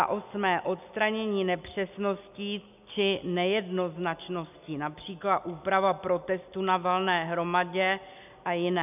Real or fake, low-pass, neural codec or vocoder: real; 3.6 kHz; none